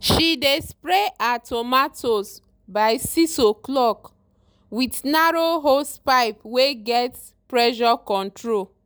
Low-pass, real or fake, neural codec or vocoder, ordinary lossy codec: none; real; none; none